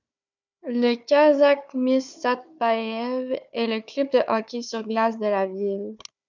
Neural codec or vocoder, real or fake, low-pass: codec, 16 kHz, 4 kbps, FunCodec, trained on Chinese and English, 50 frames a second; fake; 7.2 kHz